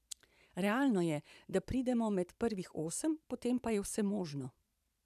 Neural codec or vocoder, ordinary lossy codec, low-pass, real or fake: vocoder, 44.1 kHz, 128 mel bands every 512 samples, BigVGAN v2; none; 14.4 kHz; fake